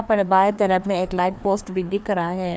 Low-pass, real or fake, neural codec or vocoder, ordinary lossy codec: none; fake; codec, 16 kHz, 2 kbps, FreqCodec, larger model; none